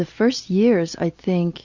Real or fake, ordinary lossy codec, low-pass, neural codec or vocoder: real; Opus, 64 kbps; 7.2 kHz; none